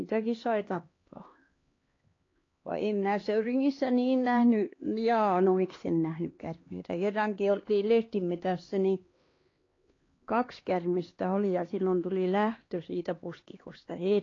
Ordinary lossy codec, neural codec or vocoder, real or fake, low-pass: AAC, 32 kbps; codec, 16 kHz, 2 kbps, X-Codec, HuBERT features, trained on LibriSpeech; fake; 7.2 kHz